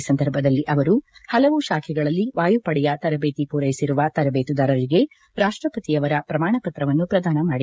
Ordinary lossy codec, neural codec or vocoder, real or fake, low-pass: none; codec, 16 kHz, 16 kbps, FreqCodec, smaller model; fake; none